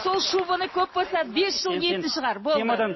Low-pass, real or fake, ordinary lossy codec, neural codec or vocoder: 7.2 kHz; real; MP3, 24 kbps; none